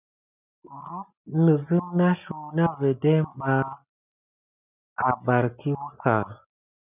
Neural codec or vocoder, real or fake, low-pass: none; real; 3.6 kHz